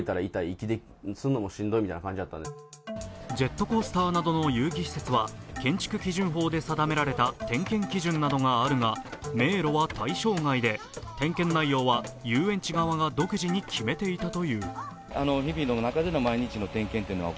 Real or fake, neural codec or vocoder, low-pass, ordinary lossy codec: real; none; none; none